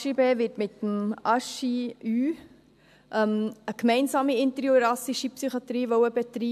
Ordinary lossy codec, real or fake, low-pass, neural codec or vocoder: AAC, 96 kbps; real; 14.4 kHz; none